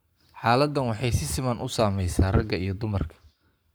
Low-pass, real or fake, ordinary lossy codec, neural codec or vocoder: none; fake; none; codec, 44.1 kHz, 7.8 kbps, Pupu-Codec